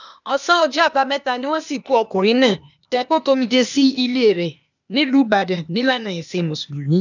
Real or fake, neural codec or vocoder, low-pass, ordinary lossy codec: fake; codec, 16 kHz, 0.8 kbps, ZipCodec; 7.2 kHz; none